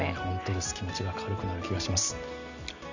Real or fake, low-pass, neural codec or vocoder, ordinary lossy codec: real; 7.2 kHz; none; none